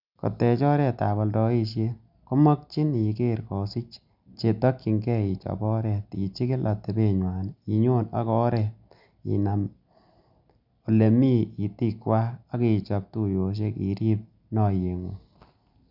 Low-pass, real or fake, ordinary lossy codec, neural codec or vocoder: 5.4 kHz; real; none; none